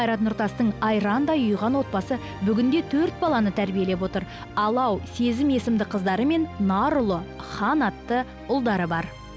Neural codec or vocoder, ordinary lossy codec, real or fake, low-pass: none; none; real; none